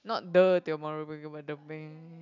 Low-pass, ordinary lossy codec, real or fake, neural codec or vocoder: 7.2 kHz; none; real; none